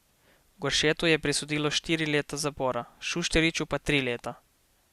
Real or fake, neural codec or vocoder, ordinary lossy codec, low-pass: real; none; Opus, 64 kbps; 14.4 kHz